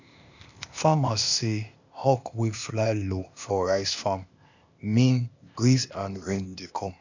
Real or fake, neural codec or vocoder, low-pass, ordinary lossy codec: fake; codec, 16 kHz, 0.8 kbps, ZipCodec; 7.2 kHz; none